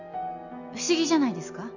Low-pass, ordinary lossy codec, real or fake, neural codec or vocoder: 7.2 kHz; none; real; none